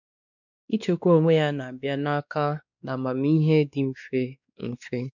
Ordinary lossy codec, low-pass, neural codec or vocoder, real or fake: none; 7.2 kHz; codec, 16 kHz, 2 kbps, X-Codec, WavLM features, trained on Multilingual LibriSpeech; fake